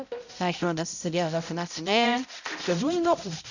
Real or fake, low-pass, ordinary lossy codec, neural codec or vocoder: fake; 7.2 kHz; none; codec, 16 kHz, 0.5 kbps, X-Codec, HuBERT features, trained on balanced general audio